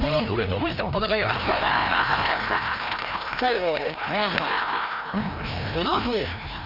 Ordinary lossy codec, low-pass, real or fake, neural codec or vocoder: none; 5.4 kHz; fake; codec, 16 kHz, 1 kbps, FreqCodec, larger model